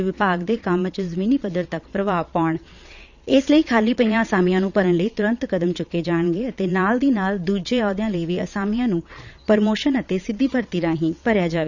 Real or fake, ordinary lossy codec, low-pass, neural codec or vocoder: fake; none; 7.2 kHz; vocoder, 22.05 kHz, 80 mel bands, Vocos